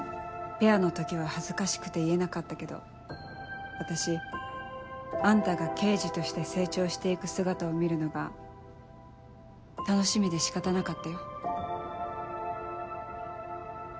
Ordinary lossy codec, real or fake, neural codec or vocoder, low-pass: none; real; none; none